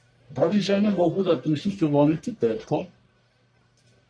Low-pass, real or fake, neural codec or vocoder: 9.9 kHz; fake; codec, 44.1 kHz, 1.7 kbps, Pupu-Codec